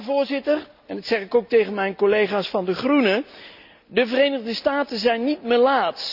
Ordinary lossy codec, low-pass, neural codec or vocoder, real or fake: none; 5.4 kHz; none; real